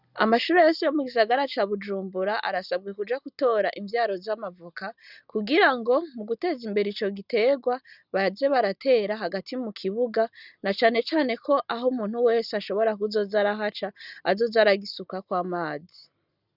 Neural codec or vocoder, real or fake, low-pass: none; real; 5.4 kHz